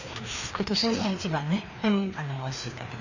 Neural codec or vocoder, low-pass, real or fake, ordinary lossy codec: codec, 16 kHz, 2 kbps, FreqCodec, larger model; 7.2 kHz; fake; none